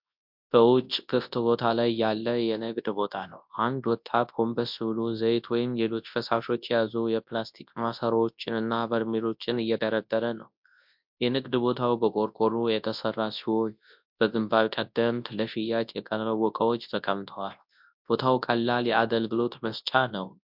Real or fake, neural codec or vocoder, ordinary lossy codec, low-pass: fake; codec, 24 kHz, 0.9 kbps, WavTokenizer, large speech release; MP3, 48 kbps; 5.4 kHz